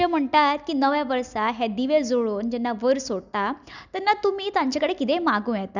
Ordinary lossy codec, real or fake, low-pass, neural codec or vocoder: none; real; 7.2 kHz; none